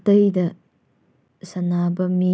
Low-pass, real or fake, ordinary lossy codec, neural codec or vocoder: none; real; none; none